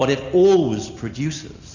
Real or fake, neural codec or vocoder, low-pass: real; none; 7.2 kHz